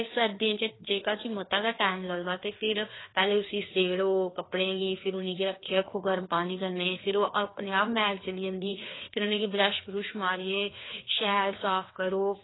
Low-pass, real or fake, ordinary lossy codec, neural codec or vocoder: 7.2 kHz; fake; AAC, 16 kbps; codec, 16 kHz, 2 kbps, FreqCodec, larger model